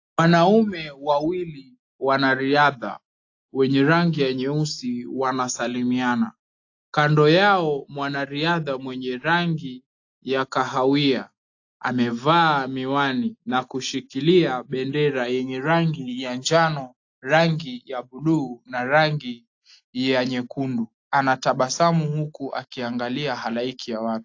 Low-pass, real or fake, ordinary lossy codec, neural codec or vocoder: 7.2 kHz; real; AAC, 48 kbps; none